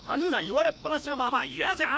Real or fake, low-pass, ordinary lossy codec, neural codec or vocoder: fake; none; none; codec, 16 kHz, 1 kbps, FreqCodec, larger model